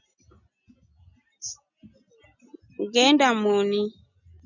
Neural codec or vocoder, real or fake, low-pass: none; real; 7.2 kHz